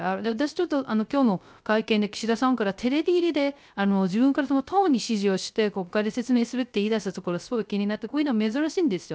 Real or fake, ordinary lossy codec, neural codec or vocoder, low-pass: fake; none; codec, 16 kHz, 0.3 kbps, FocalCodec; none